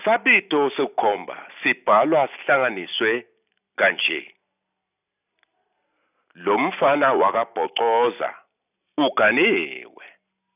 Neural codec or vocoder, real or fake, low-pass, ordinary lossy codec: none; real; 3.6 kHz; none